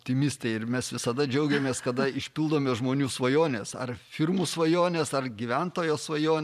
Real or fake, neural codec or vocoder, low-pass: fake; vocoder, 44.1 kHz, 128 mel bands every 512 samples, BigVGAN v2; 14.4 kHz